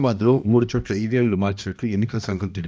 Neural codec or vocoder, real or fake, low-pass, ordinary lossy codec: codec, 16 kHz, 1 kbps, X-Codec, HuBERT features, trained on balanced general audio; fake; none; none